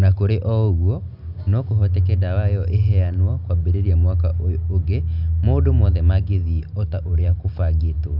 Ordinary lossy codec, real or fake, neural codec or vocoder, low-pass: none; real; none; 5.4 kHz